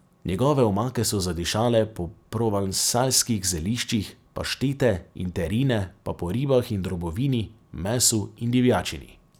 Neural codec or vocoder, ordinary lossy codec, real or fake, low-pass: none; none; real; none